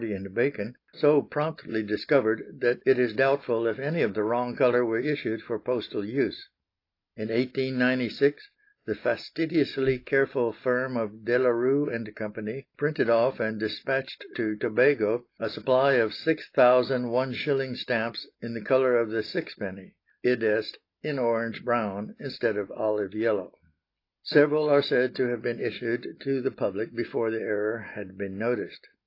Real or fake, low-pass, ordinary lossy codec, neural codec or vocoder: real; 5.4 kHz; AAC, 32 kbps; none